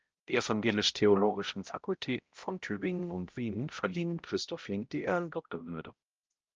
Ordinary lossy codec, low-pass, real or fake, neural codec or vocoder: Opus, 24 kbps; 7.2 kHz; fake; codec, 16 kHz, 0.5 kbps, X-Codec, HuBERT features, trained on balanced general audio